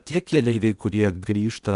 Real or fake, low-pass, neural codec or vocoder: fake; 10.8 kHz; codec, 16 kHz in and 24 kHz out, 0.8 kbps, FocalCodec, streaming, 65536 codes